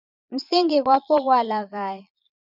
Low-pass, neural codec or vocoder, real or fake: 5.4 kHz; vocoder, 22.05 kHz, 80 mel bands, Vocos; fake